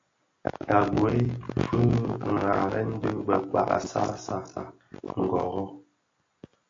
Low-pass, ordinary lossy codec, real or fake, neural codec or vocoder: 7.2 kHz; AAC, 48 kbps; real; none